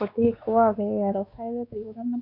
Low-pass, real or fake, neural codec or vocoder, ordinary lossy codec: 5.4 kHz; fake; codec, 16 kHz, 2 kbps, X-Codec, WavLM features, trained on Multilingual LibriSpeech; none